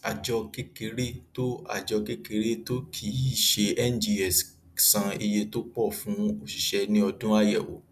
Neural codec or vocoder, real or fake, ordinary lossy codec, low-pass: none; real; none; 14.4 kHz